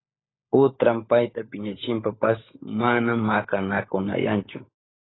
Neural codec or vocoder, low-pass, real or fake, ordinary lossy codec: codec, 16 kHz, 16 kbps, FunCodec, trained on LibriTTS, 50 frames a second; 7.2 kHz; fake; AAC, 16 kbps